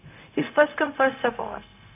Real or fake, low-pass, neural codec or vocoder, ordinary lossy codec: fake; 3.6 kHz; codec, 16 kHz, 0.4 kbps, LongCat-Audio-Codec; none